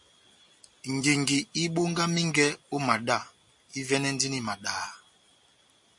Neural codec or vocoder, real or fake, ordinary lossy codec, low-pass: none; real; MP3, 64 kbps; 10.8 kHz